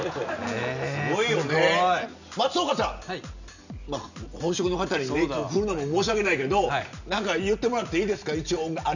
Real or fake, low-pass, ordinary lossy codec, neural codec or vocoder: real; 7.2 kHz; none; none